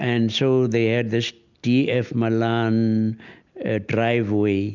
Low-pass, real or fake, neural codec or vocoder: 7.2 kHz; real; none